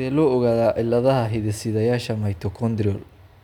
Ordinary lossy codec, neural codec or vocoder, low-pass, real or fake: none; none; 19.8 kHz; real